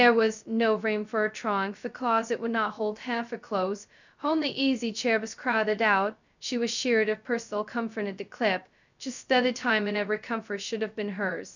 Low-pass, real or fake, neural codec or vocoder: 7.2 kHz; fake; codec, 16 kHz, 0.2 kbps, FocalCodec